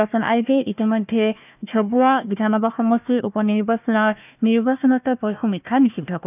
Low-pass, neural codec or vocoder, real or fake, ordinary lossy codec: 3.6 kHz; codec, 16 kHz, 1 kbps, FunCodec, trained on Chinese and English, 50 frames a second; fake; none